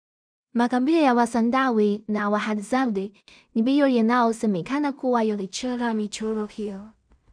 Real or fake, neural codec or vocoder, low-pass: fake; codec, 16 kHz in and 24 kHz out, 0.4 kbps, LongCat-Audio-Codec, two codebook decoder; 9.9 kHz